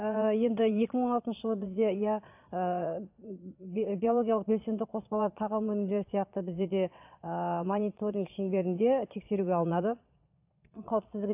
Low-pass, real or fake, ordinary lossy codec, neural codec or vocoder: 3.6 kHz; fake; AAC, 32 kbps; vocoder, 22.05 kHz, 80 mel bands, Vocos